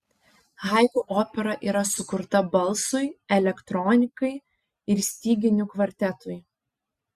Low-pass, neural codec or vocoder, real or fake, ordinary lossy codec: 14.4 kHz; none; real; Opus, 64 kbps